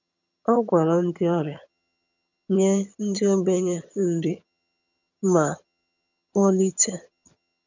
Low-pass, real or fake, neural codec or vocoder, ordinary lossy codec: 7.2 kHz; fake; vocoder, 22.05 kHz, 80 mel bands, HiFi-GAN; none